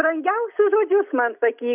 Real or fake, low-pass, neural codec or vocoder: real; 3.6 kHz; none